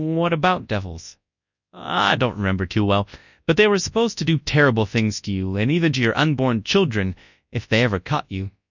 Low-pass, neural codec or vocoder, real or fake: 7.2 kHz; codec, 24 kHz, 0.9 kbps, WavTokenizer, large speech release; fake